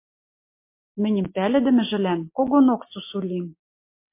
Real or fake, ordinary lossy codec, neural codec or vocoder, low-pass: real; MP3, 24 kbps; none; 3.6 kHz